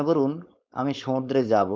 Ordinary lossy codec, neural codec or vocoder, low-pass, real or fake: none; codec, 16 kHz, 4.8 kbps, FACodec; none; fake